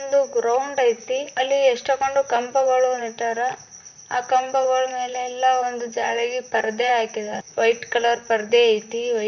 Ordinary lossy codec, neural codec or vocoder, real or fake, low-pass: none; vocoder, 44.1 kHz, 128 mel bands, Pupu-Vocoder; fake; 7.2 kHz